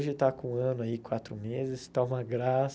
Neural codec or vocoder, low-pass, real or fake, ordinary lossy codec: none; none; real; none